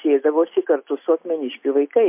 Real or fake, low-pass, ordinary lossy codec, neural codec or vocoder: real; 3.6 kHz; MP3, 24 kbps; none